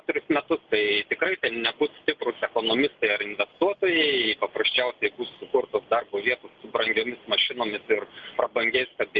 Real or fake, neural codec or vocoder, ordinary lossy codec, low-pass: real; none; Opus, 32 kbps; 7.2 kHz